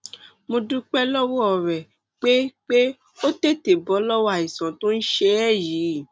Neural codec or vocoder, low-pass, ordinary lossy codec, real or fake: none; none; none; real